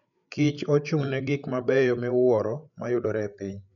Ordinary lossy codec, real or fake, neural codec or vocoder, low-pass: MP3, 96 kbps; fake; codec, 16 kHz, 8 kbps, FreqCodec, larger model; 7.2 kHz